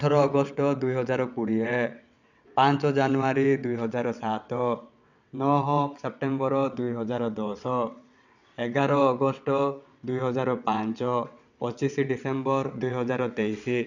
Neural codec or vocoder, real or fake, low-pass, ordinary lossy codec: vocoder, 22.05 kHz, 80 mel bands, WaveNeXt; fake; 7.2 kHz; none